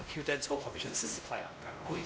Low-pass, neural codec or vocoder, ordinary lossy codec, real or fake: none; codec, 16 kHz, 1 kbps, X-Codec, WavLM features, trained on Multilingual LibriSpeech; none; fake